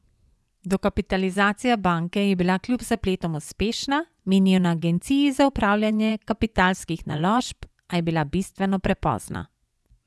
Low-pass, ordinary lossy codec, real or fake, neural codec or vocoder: none; none; fake; vocoder, 24 kHz, 100 mel bands, Vocos